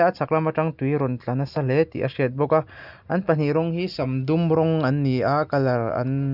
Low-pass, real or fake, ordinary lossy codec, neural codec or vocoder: 5.4 kHz; real; none; none